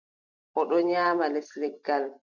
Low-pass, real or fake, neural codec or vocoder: 7.2 kHz; real; none